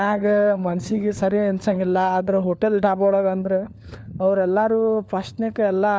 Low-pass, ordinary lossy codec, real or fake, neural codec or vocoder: none; none; fake; codec, 16 kHz, 4 kbps, FunCodec, trained on LibriTTS, 50 frames a second